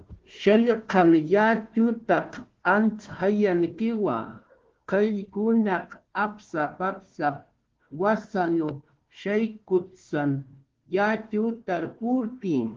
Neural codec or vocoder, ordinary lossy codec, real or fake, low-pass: codec, 16 kHz, 1 kbps, FunCodec, trained on Chinese and English, 50 frames a second; Opus, 16 kbps; fake; 7.2 kHz